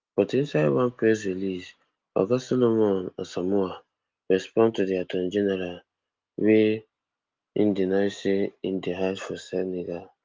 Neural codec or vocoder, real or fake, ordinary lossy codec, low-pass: none; real; Opus, 24 kbps; 7.2 kHz